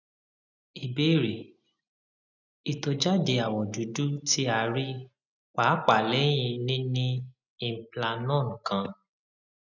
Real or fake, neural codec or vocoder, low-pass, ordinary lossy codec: real; none; 7.2 kHz; none